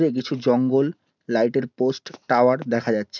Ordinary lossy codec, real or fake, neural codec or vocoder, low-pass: none; real; none; 7.2 kHz